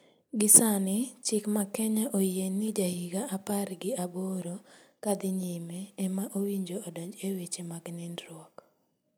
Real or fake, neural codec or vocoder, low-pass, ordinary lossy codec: real; none; none; none